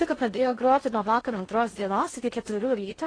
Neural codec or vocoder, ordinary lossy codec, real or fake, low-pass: codec, 16 kHz in and 24 kHz out, 0.6 kbps, FocalCodec, streaming, 4096 codes; AAC, 32 kbps; fake; 9.9 kHz